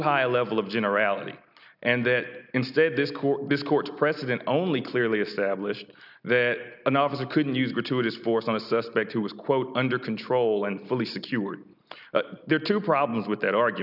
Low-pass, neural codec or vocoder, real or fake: 5.4 kHz; none; real